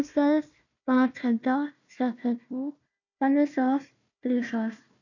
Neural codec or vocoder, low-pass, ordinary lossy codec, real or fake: codec, 16 kHz, 1 kbps, FunCodec, trained on Chinese and English, 50 frames a second; 7.2 kHz; none; fake